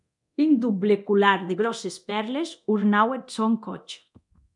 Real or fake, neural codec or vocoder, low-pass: fake; codec, 24 kHz, 0.9 kbps, DualCodec; 10.8 kHz